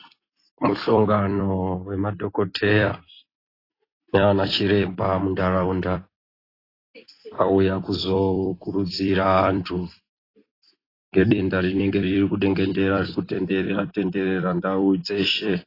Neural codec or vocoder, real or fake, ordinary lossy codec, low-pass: vocoder, 44.1 kHz, 128 mel bands every 256 samples, BigVGAN v2; fake; AAC, 24 kbps; 5.4 kHz